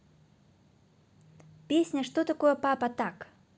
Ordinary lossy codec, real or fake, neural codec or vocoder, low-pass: none; real; none; none